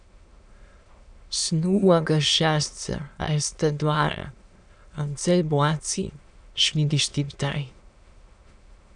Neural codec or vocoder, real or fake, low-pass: autoencoder, 22.05 kHz, a latent of 192 numbers a frame, VITS, trained on many speakers; fake; 9.9 kHz